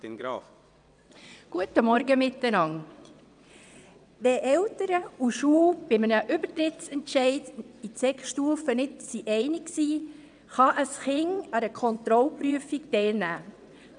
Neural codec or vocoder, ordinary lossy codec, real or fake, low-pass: vocoder, 22.05 kHz, 80 mel bands, WaveNeXt; none; fake; 9.9 kHz